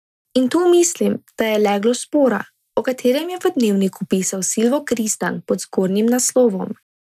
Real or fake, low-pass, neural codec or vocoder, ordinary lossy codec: real; 14.4 kHz; none; none